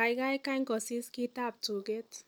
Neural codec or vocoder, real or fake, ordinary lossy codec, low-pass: none; real; none; none